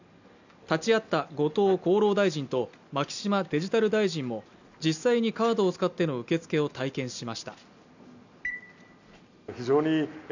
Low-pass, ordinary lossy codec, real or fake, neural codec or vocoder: 7.2 kHz; none; real; none